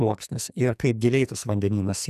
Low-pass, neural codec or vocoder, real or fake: 14.4 kHz; codec, 44.1 kHz, 2.6 kbps, SNAC; fake